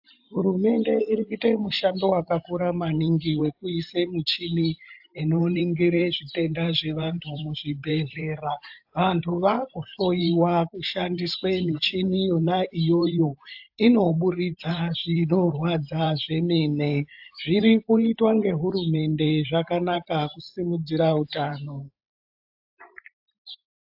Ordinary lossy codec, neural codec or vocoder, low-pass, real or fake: AAC, 48 kbps; vocoder, 44.1 kHz, 128 mel bands every 512 samples, BigVGAN v2; 5.4 kHz; fake